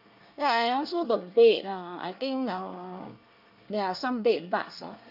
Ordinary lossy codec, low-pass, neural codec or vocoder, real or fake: none; 5.4 kHz; codec, 24 kHz, 1 kbps, SNAC; fake